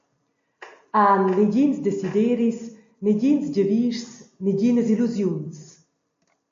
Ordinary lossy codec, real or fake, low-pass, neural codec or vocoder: AAC, 48 kbps; real; 7.2 kHz; none